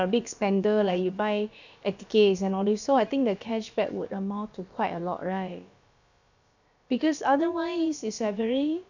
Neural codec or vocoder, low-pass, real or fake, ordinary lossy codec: codec, 16 kHz, about 1 kbps, DyCAST, with the encoder's durations; 7.2 kHz; fake; none